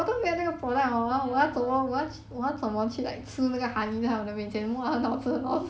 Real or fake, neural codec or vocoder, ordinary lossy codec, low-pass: real; none; none; none